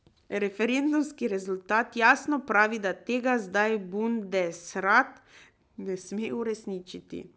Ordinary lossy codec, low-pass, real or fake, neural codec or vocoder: none; none; real; none